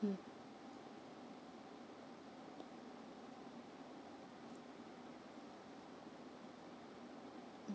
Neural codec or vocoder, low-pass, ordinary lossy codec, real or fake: none; none; none; real